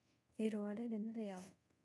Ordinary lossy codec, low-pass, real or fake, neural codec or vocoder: none; none; fake; codec, 24 kHz, 0.5 kbps, DualCodec